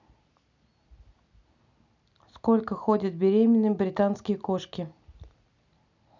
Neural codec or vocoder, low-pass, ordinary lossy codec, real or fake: none; 7.2 kHz; none; real